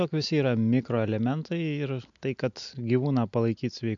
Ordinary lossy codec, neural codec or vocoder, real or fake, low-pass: MP3, 64 kbps; none; real; 7.2 kHz